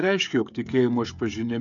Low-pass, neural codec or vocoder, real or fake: 7.2 kHz; codec, 16 kHz, 16 kbps, FreqCodec, smaller model; fake